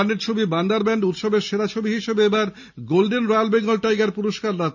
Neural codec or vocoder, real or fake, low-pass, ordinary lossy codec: none; real; 7.2 kHz; none